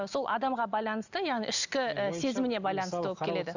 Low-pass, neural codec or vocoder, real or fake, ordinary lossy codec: 7.2 kHz; none; real; none